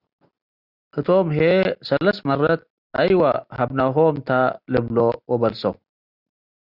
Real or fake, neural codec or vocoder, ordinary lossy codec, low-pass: real; none; Opus, 64 kbps; 5.4 kHz